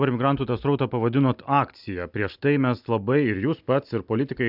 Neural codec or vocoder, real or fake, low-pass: none; real; 5.4 kHz